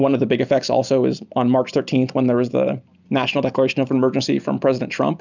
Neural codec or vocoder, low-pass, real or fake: none; 7.2 kHz; real